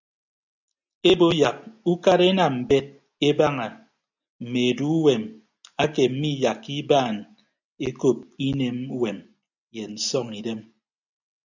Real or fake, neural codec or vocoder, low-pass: real; none; 7.2 kHz